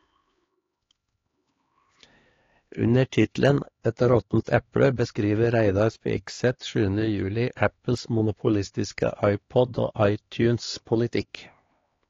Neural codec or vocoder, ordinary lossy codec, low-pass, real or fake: codec, 16 kHz, 2 kbps, X-Codec, HuBERT features, trained on LibriSpeech; AAC, 32 kbps; 7.2 kHz; fake